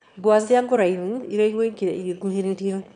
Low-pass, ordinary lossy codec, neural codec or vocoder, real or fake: 9.9 kHz; none; autoencoder, 22.05 kHz, a latent of 192 numbers a frame, VITS, trained on one speaker; fake